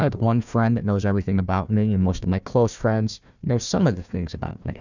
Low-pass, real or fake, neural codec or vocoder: 7.2 kHz; fake; codec, 16 kHz, 1 kbps, FunCodec, trained on Chinese and English, 50 frames a second